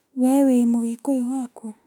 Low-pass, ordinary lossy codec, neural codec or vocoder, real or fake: 19.8 kHz; none; autoencoder, 48 kHz, 32 numbers a frame, DAC-VAE, trained on Japanese speech; fake